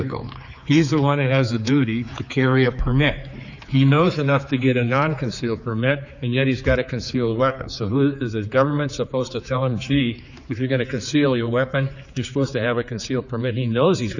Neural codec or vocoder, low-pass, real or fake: codec, 16 kHz, 4 kbps, X-Codec, HuBERT features, trained on general audio; 7.2 kHz; fake